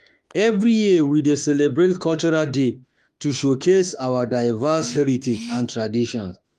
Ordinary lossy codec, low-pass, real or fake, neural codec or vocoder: Opus, 32 kbps; 14.4 kHz; fake; autoencoder, 48 kHz, 32 numbers a frame, DAC-VAE, trained on Japanese speech